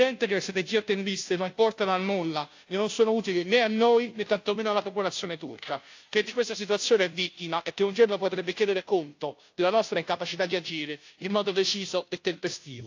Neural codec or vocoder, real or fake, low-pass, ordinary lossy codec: codec, 16 kHz, 0.5 kbps, FunCodec, trained on Chinese and English, 25 frames a second; fake; 7.2 kHz; AAC, 48 kbps